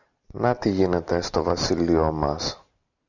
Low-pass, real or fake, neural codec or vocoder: 7.2 kHz; real; none